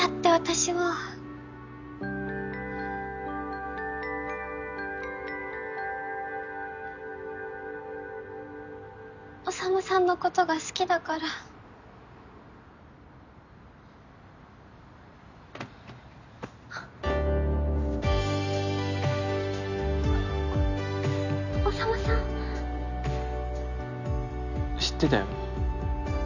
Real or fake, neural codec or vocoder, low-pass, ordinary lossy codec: real; none; 7.2 kHz; none